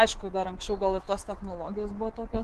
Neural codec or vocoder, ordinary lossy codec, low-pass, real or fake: codec, 24 kHz, 3.1 kbps, DualCodec; Opus, 16 kbps; 10.8 kHz; fake